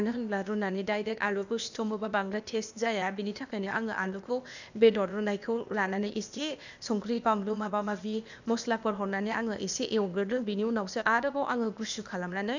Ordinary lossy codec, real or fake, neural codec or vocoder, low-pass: none; fake; codec, 16 kHz, 0.8 kbps, ZipCodec; 7.2 kHz